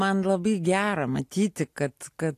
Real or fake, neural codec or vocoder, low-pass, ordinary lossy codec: real; none; 14.4 kHz; AAC, 64 kbps